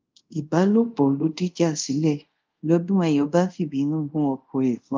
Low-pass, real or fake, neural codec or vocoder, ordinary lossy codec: 7.2 kHz; fake; codec, 24 kHz, 0.5 kbps, DualCodec; Opus, 24 kbps